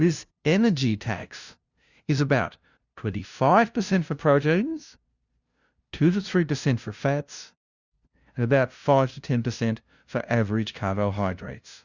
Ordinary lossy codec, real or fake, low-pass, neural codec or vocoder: Opus, 64 kbps; fake; 7.2 kHz; codec, 16 kHz, 0.5 kbps, FunCodec, trained on LibriTTS, 25 frames a second